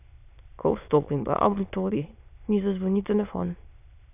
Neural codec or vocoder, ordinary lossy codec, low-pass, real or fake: autoencoder, 22.05 kHz, a latent of 192 numbers a frame, VITS, trained on many speakers; AAC, 32 kbps; 3.6 kHz; fake